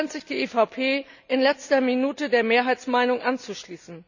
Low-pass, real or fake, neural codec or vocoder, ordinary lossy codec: 7.2 kHz; real; none; none